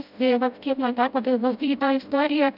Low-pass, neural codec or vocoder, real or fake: 5.4 kHz; codec, 16 kHz, 0.5 kbps, FreqCodec, smaller model; fake